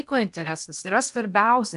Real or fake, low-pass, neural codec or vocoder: fake; 10.8 kHz; codec, 16 kHz in and 24 kHz out, 0.8 kbps, FocalCodec, streaming, 65536 codes